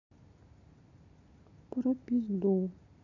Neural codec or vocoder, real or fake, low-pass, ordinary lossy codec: none; real; 7.2 kHz; none